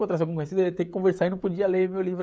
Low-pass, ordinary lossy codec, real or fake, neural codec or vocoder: none; none; fake; codec, 16 kHz, 16 kbps, FreqCodec, smaller model